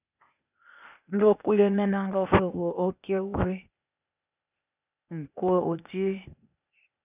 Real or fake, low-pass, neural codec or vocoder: fake; 3.6 kHz; codec, 16 kHz, 0.8 kbps, ZipCodec